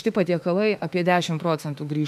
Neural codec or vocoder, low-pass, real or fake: autoencoder, 48 kHz, 32 numbers a frame, DAC-VAE, trained on Japanese speech; 14.4 kHz; fake